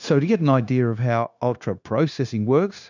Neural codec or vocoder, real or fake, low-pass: codec, 16 kHz, 0.9 kbps, LongCat-Audio-Codec; fake; 7.2 kHz